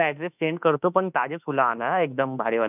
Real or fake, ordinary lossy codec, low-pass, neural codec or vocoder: fake; none; 3.6 kHz; autoencoder, 48 kHz, 32 numbers a frame, DAC-VAE, trained on Japanese speech